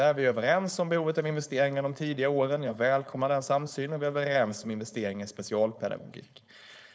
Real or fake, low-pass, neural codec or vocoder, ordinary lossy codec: fake; none; codec, 16 kHz, 4.8 kbps, FACodec; none